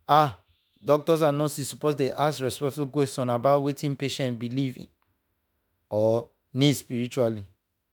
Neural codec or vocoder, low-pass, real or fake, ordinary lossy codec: autoencoder, 48 kHz, 32 numbers a frame, DAC-VAE, trained on Japanese speech; none; fake; none